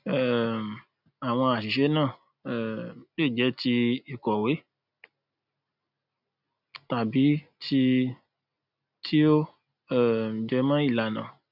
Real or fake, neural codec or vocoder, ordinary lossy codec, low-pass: real; none; none; 5.4 kHz